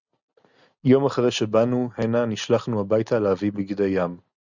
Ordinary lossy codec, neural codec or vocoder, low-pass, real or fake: Opus, 64 kbps; none; 7.2 kHz; real